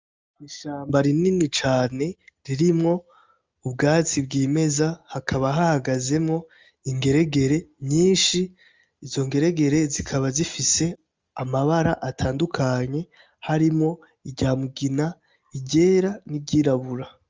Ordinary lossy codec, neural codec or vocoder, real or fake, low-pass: Opus, 32 kbps; none; real; 7.2 kHz